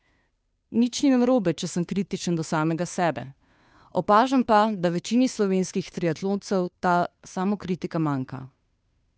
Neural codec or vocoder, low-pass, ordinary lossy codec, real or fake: codec, 16 kHz, 2 kbps, FunCodec, trained on Chinese and English, 25 frames a second; none; none; fake